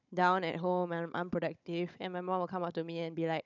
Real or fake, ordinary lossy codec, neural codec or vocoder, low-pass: fake; none; codec, 16 kHz, 16 kbps, FunCodec, trained on Chinese and English, 50 frames a second; 7.2 kHz